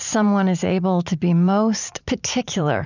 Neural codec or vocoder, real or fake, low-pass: vocoder, 44.1 kHz, 80 mel bands, Vocos; fake; 7.2 kHz